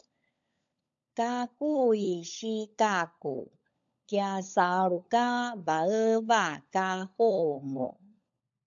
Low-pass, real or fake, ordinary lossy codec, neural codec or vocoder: 7.2 kHz; fake; AAC, 64 kbps; codec, 16 kHz, 16 kbps, FunCodec, trained on LibriTTS, 50 frames a second